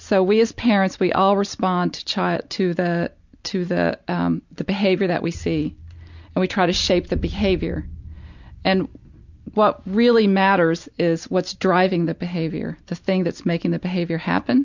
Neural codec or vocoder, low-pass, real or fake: none; 7.2 kHz; real